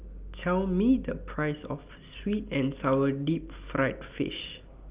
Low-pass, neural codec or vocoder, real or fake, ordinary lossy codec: 3.6 kHz; none; real; Opus, 24 kbps